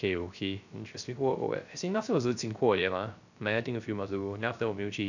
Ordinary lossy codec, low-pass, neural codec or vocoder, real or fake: none; 7.2 kHz; codec, 16 kHz, 0.3 kbps, FocalCodec; fake